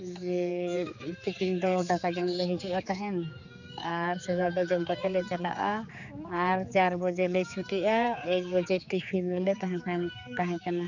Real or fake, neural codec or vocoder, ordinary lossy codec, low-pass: fake; codec, 16 kHz, 4 kbps, X-Codec, HuBERT features, trained on general audio; none; 7.2 kHz